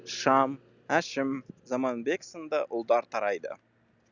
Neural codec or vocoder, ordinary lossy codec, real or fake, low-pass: none; none; real; 7.2 kHz